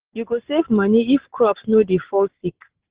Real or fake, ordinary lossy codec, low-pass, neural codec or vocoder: real; Opus, 16 kbps; 3.6 kHz; none